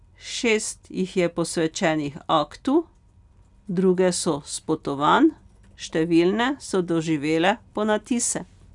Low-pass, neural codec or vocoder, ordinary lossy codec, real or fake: 10.8 kHz; none; none; real